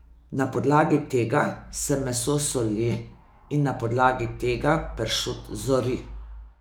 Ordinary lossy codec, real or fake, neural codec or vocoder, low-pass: none; fake; codec, 44.1 kHz, 7.8 kbps, DAC; none